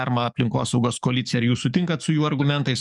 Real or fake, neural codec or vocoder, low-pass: fake; codec, 44.1 kHz, 7.8 kbps, DAC; 10.8 kHz